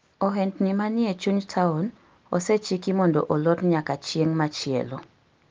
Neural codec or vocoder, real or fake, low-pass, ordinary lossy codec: none; real; 7.2 kHz; Opus, 32 kbps